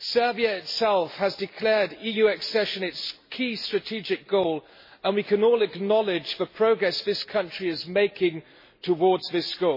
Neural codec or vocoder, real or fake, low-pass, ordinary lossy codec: none; real; 5.4 kHz; MP3, 24 kbps